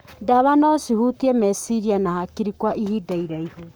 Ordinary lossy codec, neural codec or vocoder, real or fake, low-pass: none; codec, 44.1 kHz, 7.8 kbps, Pupu-Codec; fake; none